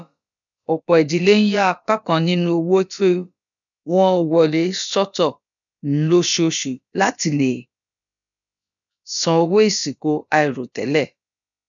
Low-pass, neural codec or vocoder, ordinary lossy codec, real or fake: 7.2 kHz; codec, 16 kHz, about 1 kbps, DyCAST, with the encoder's durations; none; fake